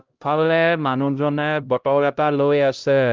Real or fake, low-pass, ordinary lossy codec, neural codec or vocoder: fake; 7.2 kHz; Opus, 24 kbps; codec, 16 kHz, 0.5 kbps, X-Codec, HuBERT features, trained on LibriSpeech